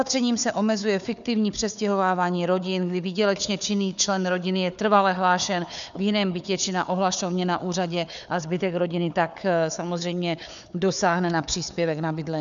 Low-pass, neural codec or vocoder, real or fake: 7.2 kHz; codec, 16 kHz, 4 kbps, FunCodec, trained on Chinese and English, 50 frames a second; fake